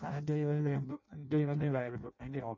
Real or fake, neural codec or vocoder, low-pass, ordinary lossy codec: fake; codec, 16 kHz in and 24 kHz out, 0.6 kbps, FireRedTTS-2 codec; 7.2 kHz; MP3, 32 kbps